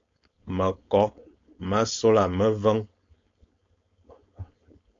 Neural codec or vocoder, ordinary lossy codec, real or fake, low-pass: codec, 16 kHz, 4.8 kbps, FACodec; AAC, 48 kbps; fake; 7.2 kHz